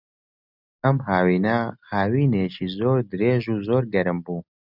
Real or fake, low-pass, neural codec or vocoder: real; 5.4 kHz; none